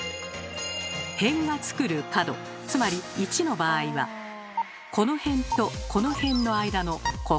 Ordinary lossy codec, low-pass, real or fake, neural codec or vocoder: none; none; real; none